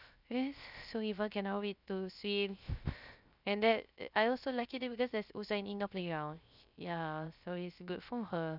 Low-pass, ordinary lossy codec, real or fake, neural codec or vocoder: 5.4 kHz; none; fake; codec, 16 kHz, 0.3 kbps, FocalCodec